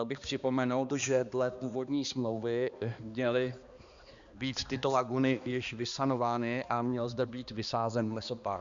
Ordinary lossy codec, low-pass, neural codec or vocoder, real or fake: Opus, 64 kbps; 7.2 kHz; codec, 16 kHz, 2 kbps, X-Codec, HuBERT features, trained on balanced general audio; fake